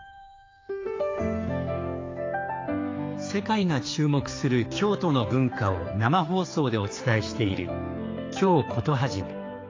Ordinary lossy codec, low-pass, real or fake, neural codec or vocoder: AAC, 32 kbps; 7.2 kHz; fake; codec, 16 kHz, 4 kbps, X-Codec, HuBERT features, trained on general audio